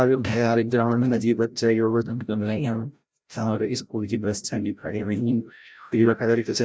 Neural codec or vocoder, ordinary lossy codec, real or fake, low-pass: codec, 16 kHz, 0.5 kbps, FreqCodec, larger model; none; fake; none